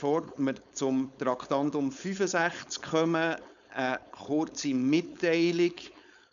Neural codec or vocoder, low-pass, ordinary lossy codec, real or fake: codec, 16 kHz, 4.8 kbps, FACodec; 7.2 kHz; none; fake